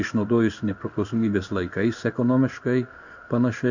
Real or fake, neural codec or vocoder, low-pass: fake; codec, 16 kHz in and 24 kHz out, 1 kbps, XY-Tokenizer; 7.2 kHz